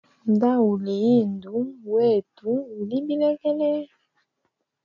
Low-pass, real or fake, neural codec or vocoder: 7.2 kHz; real; none